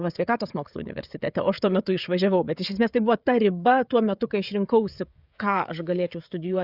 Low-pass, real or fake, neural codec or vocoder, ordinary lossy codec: 5.4 kHz; fake; codec, 16 kHz, 16 kbps, FreqCodec, smaller model; Opus, 64 kbps